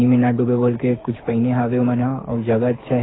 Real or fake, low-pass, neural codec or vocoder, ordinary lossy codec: fake; 7.2 kHz; codec, 24 kHz, 6 kbps, HILCodec; AAC, 16 kbps